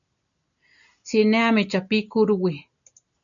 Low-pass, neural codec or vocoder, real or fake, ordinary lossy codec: 7.2 kHz; none; real; MP3, 96 kbps